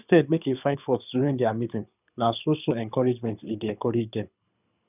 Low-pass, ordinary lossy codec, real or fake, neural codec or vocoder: 3.6 kHz; none; fake; codec, 24 kHz, 6 kbps, HILCodec